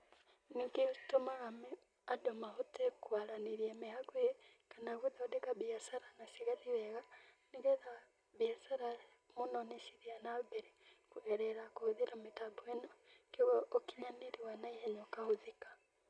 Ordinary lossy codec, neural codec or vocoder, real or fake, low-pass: none; none; real; 9.9 kHz